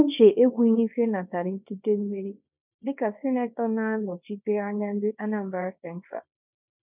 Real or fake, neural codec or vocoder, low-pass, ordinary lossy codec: fake; codec, 24 kHz, 0.9 kbps, WavTokenizer, small release; 3.6 kHz; none